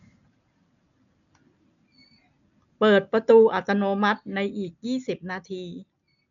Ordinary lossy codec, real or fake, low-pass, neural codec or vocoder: none; real; 7.2 kHz; none